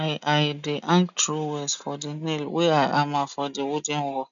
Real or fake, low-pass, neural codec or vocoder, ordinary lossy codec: real; 7.2 kHz; none; none